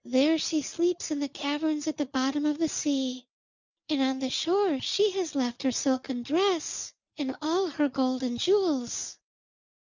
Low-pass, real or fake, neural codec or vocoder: 7.2 kHz; real; none